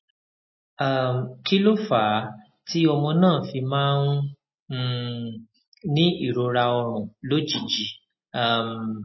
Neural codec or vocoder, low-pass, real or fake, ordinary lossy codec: none; 7.2 kHz; real; MP3, 24 kbps